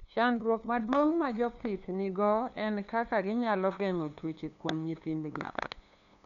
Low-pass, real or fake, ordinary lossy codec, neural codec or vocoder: 7.2 kHz; fake; none; codec, 16 kHz, 2 kbps, FunCodec, trained on LibriTTS, 25 frames a second